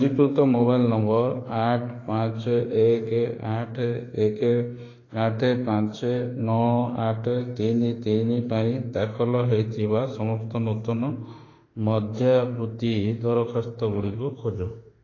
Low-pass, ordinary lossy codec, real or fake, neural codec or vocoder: 7.2 kHz; AAC, 32 kbps; fake; autoencoder, 48 kHz, 32 numbers a frame, DAC-VAE, trained on Japanese speech